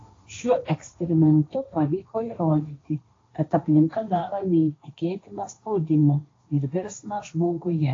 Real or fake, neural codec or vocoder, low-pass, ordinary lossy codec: fake; codec, 16 kHz, 1.1 kbps, Voila-Tokenizer; 7.2 kHz; AAC, 32 kbps